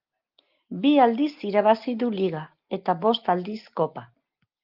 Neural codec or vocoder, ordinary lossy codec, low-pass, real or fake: none; Opus, 32 kbps; 5.4 kHz; real